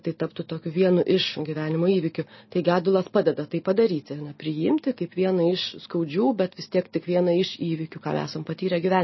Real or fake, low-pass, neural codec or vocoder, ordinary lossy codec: real; 7.2 kHz; none; MP3, 24 kbps